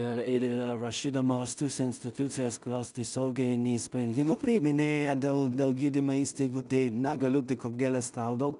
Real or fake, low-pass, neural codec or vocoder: fake; 10.8 kHz; codec, 16 kHz in and 24 kHz out, 0.4 kbps, LongCat-Audio-Codec, two codebook decoder